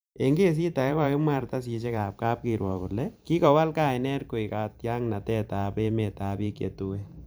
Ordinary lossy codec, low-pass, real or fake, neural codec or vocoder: none; none; fake; vocoder, 44.1 kHz, 128 mel bands every 512 samples, BigVGAN v2